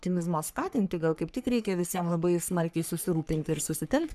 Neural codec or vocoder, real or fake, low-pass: codec, 44.1 kHz, 3.4 kbps, Pupu-Codec; fake; 14.4 kHz